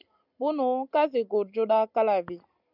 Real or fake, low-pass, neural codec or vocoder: real; 5.4 kHz; none